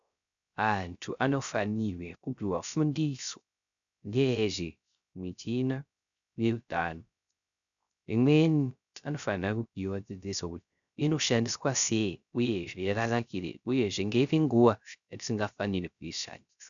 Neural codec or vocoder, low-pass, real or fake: codec, 16 kHz, 0.3 kbps, FocalCodec; 7.2 kHz; fake